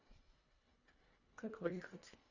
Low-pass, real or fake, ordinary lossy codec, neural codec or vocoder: 7.2 kHz; fake; Opus, 64 kbps; codec, 24 kHz, 1.5 kbps, HILCodec